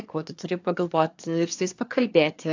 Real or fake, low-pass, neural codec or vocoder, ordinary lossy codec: fake; 7.2 kHz; codec, 24 kHz, 1 kbps, SNAC; AAC, 48 kbps